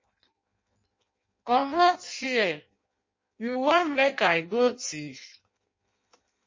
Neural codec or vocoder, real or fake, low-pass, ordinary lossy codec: codec, 16 kHz in and 24 kHz out, 0.6 kbps, FireRedTTS-2 codec; fake; 7.2 kHz; MP3, 32 kbps